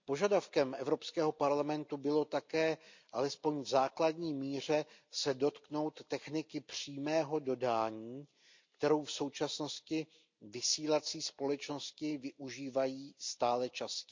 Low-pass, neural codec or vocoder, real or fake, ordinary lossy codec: 7.2 kHz; none; real; MP3, 64 kbps